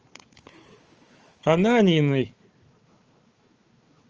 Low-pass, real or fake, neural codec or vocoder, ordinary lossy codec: 7.2 kHz; fake; codec, 16 kHz, 4 kbps, FunCodec, trained on Chinese and English, 50 frames a second; Opus, 24 kbps